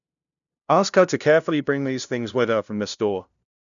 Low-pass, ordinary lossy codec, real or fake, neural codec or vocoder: 7.2 kHz; none; fake; codec, 16 kHz, 0.5 kbps, FunCodec, trained on LibriTTS, 25 frames a second